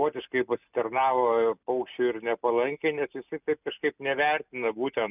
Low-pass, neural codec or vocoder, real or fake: 3.6 kHz; none; real